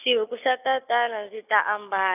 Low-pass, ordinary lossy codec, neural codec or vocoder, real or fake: 3.6 kHz; none; none; real